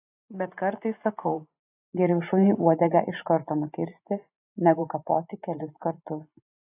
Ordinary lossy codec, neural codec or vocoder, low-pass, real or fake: AAC, 24 kbps; vocoder, 44.1 kHz, 128 mel bands every 512 samples, BigVGAN v2; 3.6 kHz; fake